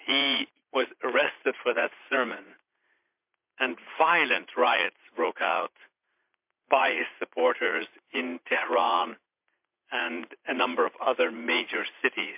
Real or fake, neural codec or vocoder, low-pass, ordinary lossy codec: fake; vocoder, 44.1 kHz, 80 mel bands, Vocos; 3.6 kHz; MP3, 32 kbps